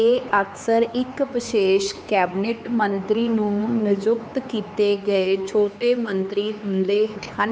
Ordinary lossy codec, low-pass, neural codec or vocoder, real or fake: none; none; codec, 16 kHz, 4 kbps, X-Codec, HuBERT features, trained on LibriSpeech; fake